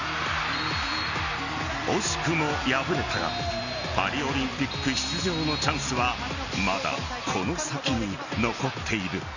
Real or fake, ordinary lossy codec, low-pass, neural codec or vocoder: real; none; 7.2 kHz; none